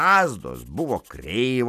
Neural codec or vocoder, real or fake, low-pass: none; real; 14.4 kHz